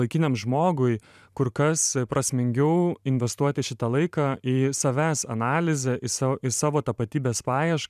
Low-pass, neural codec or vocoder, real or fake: 14.4 kHz; vocoder, 44.1 kHz, 128 mel bands every 512 samples, BigVGAN v2; fake